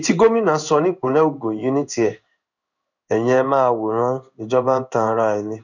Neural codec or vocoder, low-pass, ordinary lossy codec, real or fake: codec, 16 kHz in and 24 kHz out, 1 kbps, XY-Tokenizer; 7.2 kHz; none; fake